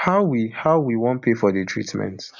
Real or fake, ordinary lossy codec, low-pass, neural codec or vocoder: real; none; 7.2 kHz; none